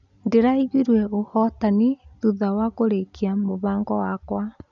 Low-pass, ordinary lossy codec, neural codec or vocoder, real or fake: 7.2 kHz; none; none; real